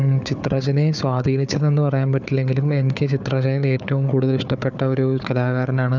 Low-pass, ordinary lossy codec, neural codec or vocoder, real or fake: 7.2 kHz; none; codec, 16 kHz, 4 kbps, FunCodec, trained on Chinese and English, 50 frames a second; fake